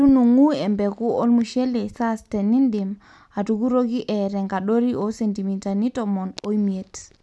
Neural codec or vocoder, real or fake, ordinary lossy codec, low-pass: none; real; none; none